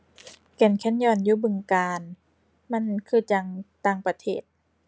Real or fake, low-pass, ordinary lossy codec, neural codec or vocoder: real; none; none; none